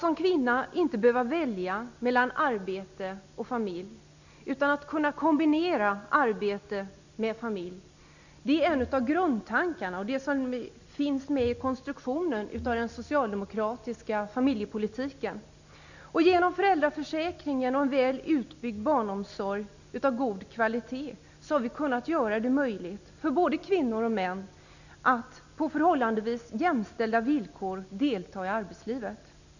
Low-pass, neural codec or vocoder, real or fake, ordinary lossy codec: 7.2 kHz; none; real; none